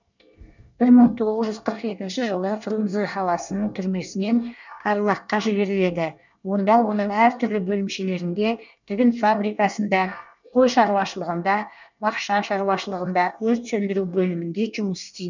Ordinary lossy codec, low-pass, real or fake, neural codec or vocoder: none; 7.2 kHz; fake; codec, 24 kHz, 1 kbps, SNAC